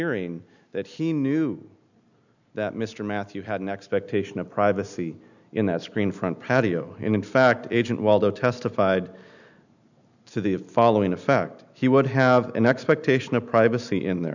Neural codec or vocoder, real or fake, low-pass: none; real; 7.2 kHz